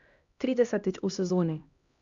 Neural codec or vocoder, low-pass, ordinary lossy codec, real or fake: codec, 16 kHz, 1 kbps, X-Codec, HuBERT features, trained on LibriSpeech; 7.2 kHz; Opus, 64 kbps; fake